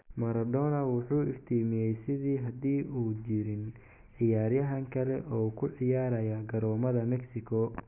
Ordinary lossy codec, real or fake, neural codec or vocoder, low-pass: none; real; none; 3.6 kHz